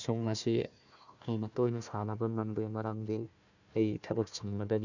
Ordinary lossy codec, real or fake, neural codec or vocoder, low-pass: none; fake; codec, 16 kHz, 1 kbps, FunCodec, trained on Chinese and English, 50 frames a second; 7.2 kHz